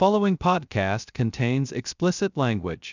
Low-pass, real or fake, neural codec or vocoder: 7.2 kHz; fake; codec, 16 kHz, 0.2 kbps, FocalCodec